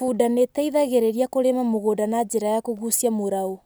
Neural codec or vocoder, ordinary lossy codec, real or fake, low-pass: none; none; real; none